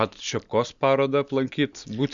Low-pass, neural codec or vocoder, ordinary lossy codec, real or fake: 7.2 kHz; none; Opus, 64 kbps; real